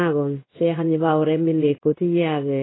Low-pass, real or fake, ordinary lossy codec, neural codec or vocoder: 7.2 kHz; fake; AAC, 16 kbps; codec, 16 kHz in and 24 kHz out, 1 kbps, XY-Tokenizer